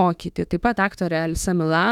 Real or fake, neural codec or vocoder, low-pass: fake; autoencoder, 48 kHz, 32 numbers a frame, DAC-VAE, trained on Japanese speech; 19.8 kHz